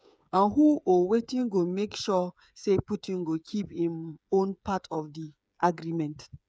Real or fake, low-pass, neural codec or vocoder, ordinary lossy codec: fake; none; codec, 16 kHz, 8 kbps, FreqCodec, smaller model; none